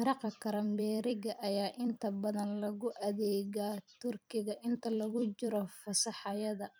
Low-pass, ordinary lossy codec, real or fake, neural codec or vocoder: none; none; fake; vocoder, 44.1 kHz, 128 mel bands every 256 samples, BigVGAN v2